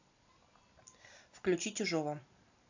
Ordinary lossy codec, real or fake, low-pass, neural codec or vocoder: AAC, 48 kbps; real; 7.2 kHz; none